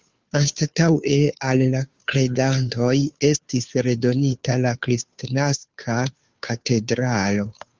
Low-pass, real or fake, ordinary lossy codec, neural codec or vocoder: 7.2 kHz; fake; Opus, 32 kbps; codec, 16 kHz, 2 kbps, FunCodec, trained on Chinese and English, 25 frames a second